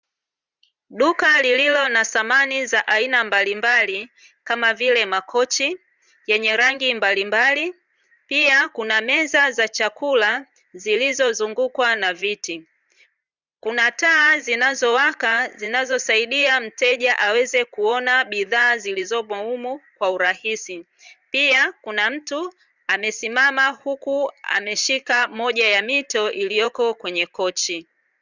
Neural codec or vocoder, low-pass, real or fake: vocoder, 44.1 kHz, 128 mel bands every 512 samples, BigVGAN v2; 7.2 kHz; fake